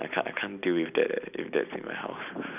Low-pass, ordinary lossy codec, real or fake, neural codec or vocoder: 3.6 kHz; none; real; none